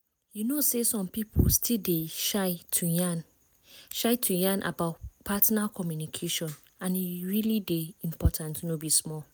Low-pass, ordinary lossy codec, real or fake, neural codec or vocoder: none; none; real; none